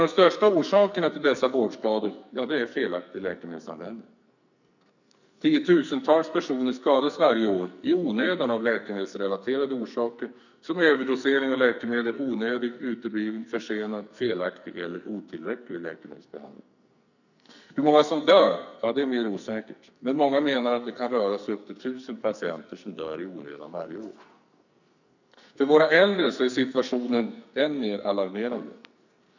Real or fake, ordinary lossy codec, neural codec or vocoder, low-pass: fake; none; codec, 44.1 kHz, 2.6 kbps, SNAC; 7.2 kHz